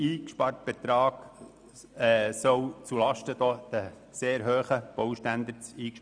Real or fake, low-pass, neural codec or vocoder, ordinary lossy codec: real; 9.9 kHz; none; none